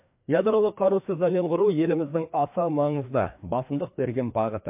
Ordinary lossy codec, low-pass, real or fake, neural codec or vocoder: MP3, 32 kbps; 3.6 kHz; fake; codec, 16 kHz, 2 kbps, FreqCodec, larger model